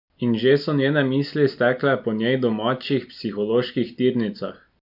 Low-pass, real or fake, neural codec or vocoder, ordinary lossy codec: 5.4 kHz; real; none; none